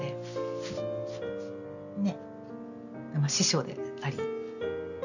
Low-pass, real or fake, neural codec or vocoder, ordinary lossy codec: 7.2 kHz; real; none; none